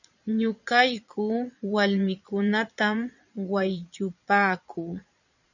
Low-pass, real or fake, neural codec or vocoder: 7.2 kHz; fake; vocoder, 22.05 kHz, 80 mel bands, Vocos